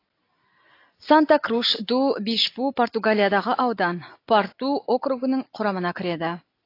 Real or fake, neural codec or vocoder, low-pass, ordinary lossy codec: real; none; 5.4 kHz; AAC, 32 kbps